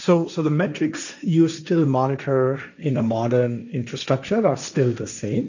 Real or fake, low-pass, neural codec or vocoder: fake; 7.2 kHz; codec, 16 kHz, 1.1 kbps, Voila-Tokenizer